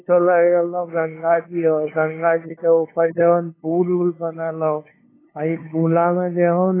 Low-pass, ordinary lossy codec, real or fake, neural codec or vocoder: 3.6 kHz; AAC, 16 kbps; fake; codec, 16 kHz, 2 kbps, FunCodec, trained on LibriTTS, 25 frames a second